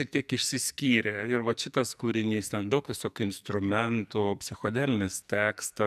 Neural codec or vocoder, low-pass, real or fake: codec, 44.1 kHz, 2.6 kbps, SNAC; 14.4 kHz; fake